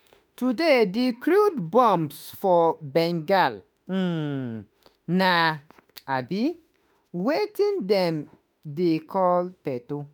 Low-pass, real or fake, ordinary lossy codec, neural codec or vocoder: none; fake; none; autoencoder, 48 kHz, 32 numbers a frame, DAC-VAE, trained on Japanese speech